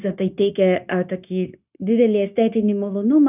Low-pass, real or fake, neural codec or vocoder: 3.6 kHz; fake; codec, 16 kHz, 0.9 kbps, LongCat-Audio-Codec